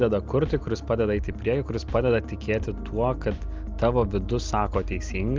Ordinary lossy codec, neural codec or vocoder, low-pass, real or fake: Opus, 24 kbps; none; 7.2 kHz; real